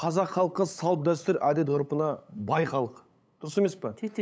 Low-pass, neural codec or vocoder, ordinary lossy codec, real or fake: none; codec, 16 kHz, 8 kbps, FunCodec, trained on LibriTTS, 25 frames a second; none; fake